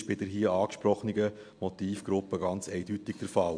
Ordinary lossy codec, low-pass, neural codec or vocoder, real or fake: MP3, 64 kbps; 9.9 kHz; none; real